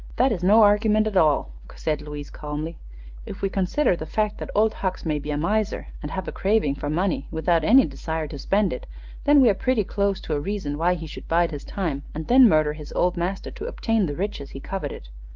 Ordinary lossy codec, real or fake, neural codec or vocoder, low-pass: Opus, 24 kbps; real; none; 7.2 kHz